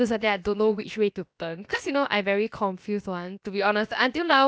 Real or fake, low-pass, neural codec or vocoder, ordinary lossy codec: fake; none; codec, 16 kHz, about 1 kbps, DyCAST, with the encoder's durations; none